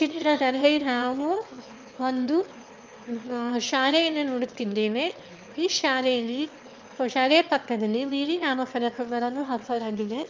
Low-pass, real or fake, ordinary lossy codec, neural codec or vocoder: 7.2 kHz; fake; Opus, 24 kbps; autoencoder, 22.05 kHz, a latent of 192 numbers a frame, VITS, trained on one speaker